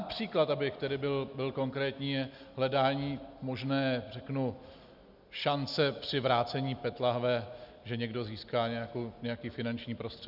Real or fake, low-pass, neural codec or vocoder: real; 5.4 kHz; none